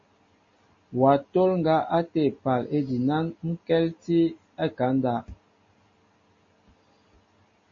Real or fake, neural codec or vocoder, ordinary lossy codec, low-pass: real; none; MP3, 32 kbps; 7.2 kHz